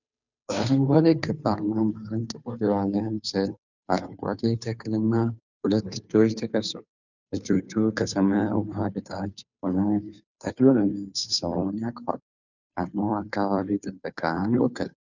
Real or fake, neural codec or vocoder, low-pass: fake; codec, 16 kHz, 2 kbps, FunCodec, trained on Chinese and English, 25 frames a second; 7.2 kHz